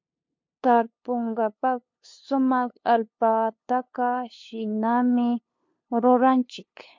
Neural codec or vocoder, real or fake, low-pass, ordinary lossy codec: codec, 16 kHz, 2 kbps, FunCodec, trained on LibriTTS, 25 frames a second; fake; 7.2 kHz; MP3, 48 kbps